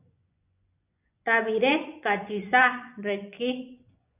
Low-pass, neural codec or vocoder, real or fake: 3.6 kHz; vocoder, 24 kHz, 100 mel bands, Vocos; fake